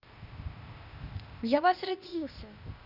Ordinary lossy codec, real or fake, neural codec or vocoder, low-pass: none; fake; codec, 16 kHz, 0.8 kbps, ZipCodec; 5.4 kHz